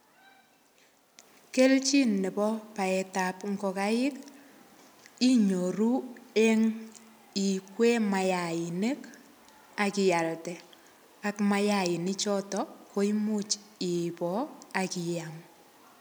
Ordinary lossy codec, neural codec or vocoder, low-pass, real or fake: none; none; none; real